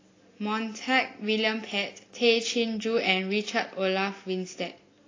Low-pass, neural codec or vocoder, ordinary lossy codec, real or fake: 7.2 kHz; none; AAC, 32 kbps; real